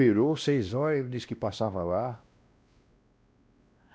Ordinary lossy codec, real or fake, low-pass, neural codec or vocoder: none; fake; none; codec, 16 kHz, 1 kbps, X-Codec, WavLM features, trained on Multilingual LibriSpeech